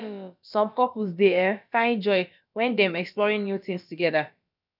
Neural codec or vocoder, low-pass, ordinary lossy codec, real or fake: codec, 16 kHz, about 1 kbps, DyCAST, with the encoder's durations; 5.4 kHz; none; fake